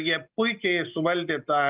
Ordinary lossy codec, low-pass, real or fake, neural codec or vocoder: Opus, 24 kbps; 3.6 kHz; real; none